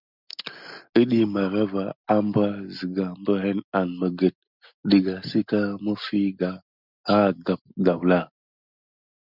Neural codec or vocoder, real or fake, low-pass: none; real; 5.4 kHz